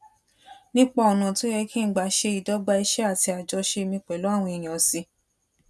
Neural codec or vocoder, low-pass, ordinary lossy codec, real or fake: none; none; none; real